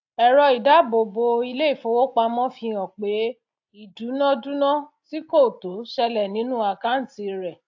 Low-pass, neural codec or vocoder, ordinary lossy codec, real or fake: 7.2 kHz; none; AAC, 48 kbps; real